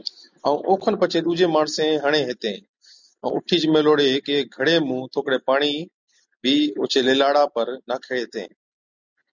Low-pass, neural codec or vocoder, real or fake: 7.2 kHz; none; real